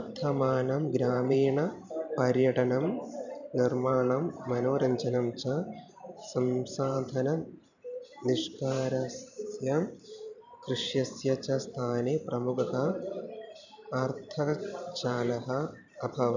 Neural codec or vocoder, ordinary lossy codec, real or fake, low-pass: vocoder, 44.1 kHz, 128 mel bands every 512 samples, BigVGAN v2; none; fake; 7.2 kHz